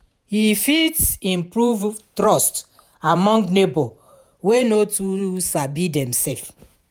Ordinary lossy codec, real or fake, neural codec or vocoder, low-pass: none; fake; vocoder, 48 kHz, 128 mel bands, Vocos; none